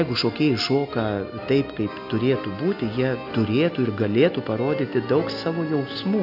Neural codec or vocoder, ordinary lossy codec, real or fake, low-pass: none; AAC, 48 kbps; real; 5.4 kHz